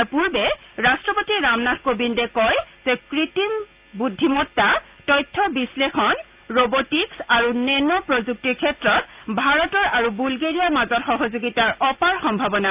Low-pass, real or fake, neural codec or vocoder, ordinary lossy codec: 3.6 kHz; real; none; Opus, 24 kbps